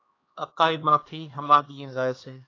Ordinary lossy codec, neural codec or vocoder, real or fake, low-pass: AAC, 32 kbps; codec, 16 kHz, 2 kbps, X-Codec, HuBERT features, trained on LibriSpeech; fake; 7.2 kHz